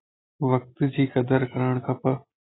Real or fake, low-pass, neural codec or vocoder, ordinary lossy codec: real; 7.2 kHz; none; AAC, 16 kbps